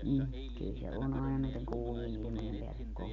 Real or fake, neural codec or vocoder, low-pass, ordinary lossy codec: real; none; 7.2 kHz; MP3, 96 kbps